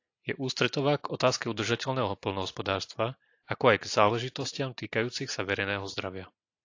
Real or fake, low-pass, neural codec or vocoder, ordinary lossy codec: real; 7.2 kHz; none; AAC, 48 kbps